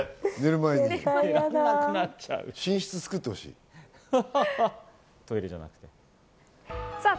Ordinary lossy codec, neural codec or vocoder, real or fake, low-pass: none; none; real; none